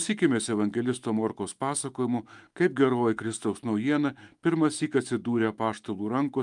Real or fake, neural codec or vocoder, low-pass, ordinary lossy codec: fake; autoencoder, 48 kHz, 128 numbers a frame, DAC-VAE, trained on Japanese speech; 10.8 kHz; Opus, 32 kbps